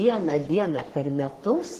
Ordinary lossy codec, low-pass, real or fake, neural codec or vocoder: Opus, 16 kbps; 14.4 kHz; fake; codec, 44.1 kHz, 3.4 kbps, Pupu-Codec